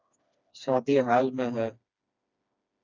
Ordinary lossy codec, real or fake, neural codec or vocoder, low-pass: Opus, 64 kbps; fake; codec, 16 kHz, 2 kbps, FreqCodec, smaller model; 7.2 kHz